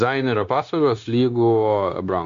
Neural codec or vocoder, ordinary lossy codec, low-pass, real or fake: codec, 16 kHz, 0.9 kbps, LongCat-Audio-Codec; AAC, 64 kbps; 7.2 kHz; fake